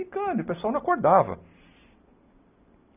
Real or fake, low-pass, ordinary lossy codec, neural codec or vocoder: real; 3.6 kHz; none; none